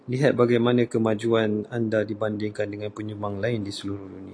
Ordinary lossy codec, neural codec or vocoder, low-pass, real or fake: AAC, 48 kbps; none; 9.9 kHz; real